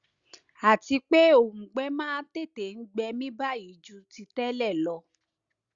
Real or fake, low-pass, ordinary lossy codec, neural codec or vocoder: real; 7.2 kHz; Opus, 64 kbps; none